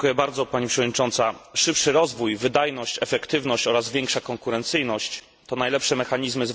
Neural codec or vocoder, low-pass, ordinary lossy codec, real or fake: none; none; none; real